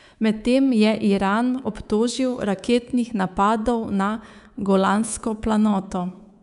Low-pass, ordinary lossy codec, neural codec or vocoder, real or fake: 10.8 kHz; none; codec, 24 kHz, 3.1 kbps, DualCodec; fake